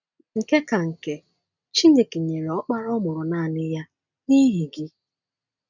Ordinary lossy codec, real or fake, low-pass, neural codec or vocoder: none; real; 7.2 kHz; none